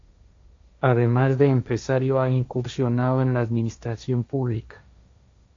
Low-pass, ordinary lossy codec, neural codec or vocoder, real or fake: 7.2 kHz; AAC, 48 kbps; codec, 16 kHz, 1.1 kbps, Voila-Tokenizer; fake